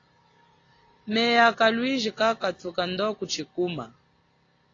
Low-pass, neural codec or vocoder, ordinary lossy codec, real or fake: 7.2 kHz; none; AAC, 32 kbps; real